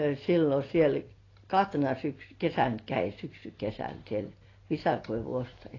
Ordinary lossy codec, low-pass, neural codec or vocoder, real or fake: AAC, 32 kbps; 7.2 kHz; none; real